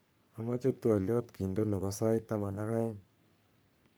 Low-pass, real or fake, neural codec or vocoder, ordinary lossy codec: none; fake; codec, 44.1 kHz, 3.4 kbps, Pupu-Codec; none